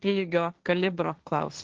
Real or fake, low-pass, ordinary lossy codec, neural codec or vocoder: fake; 7.2 kHz; Opus, 16 kbps; codec, 16 kHz, 1.1 kbps, Voila-Tokenizer